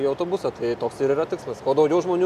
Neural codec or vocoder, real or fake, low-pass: none; real; 14.4 kHz